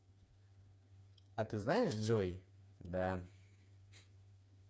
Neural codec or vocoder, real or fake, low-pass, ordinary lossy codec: codec, 16 kHz, 4 kbps, FreqCodec, smaller model; fake; none; none